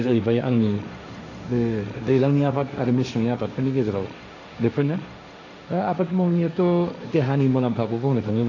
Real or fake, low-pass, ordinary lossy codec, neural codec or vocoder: fake; 7.2 kHz; AAC, 48 kbps; codec, 16 kHz, 1.1 kbps, Voila-Tokenizer